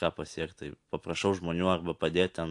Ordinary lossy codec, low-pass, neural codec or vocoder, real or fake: AAC, 64 kbps; 10.8 kHz; none; real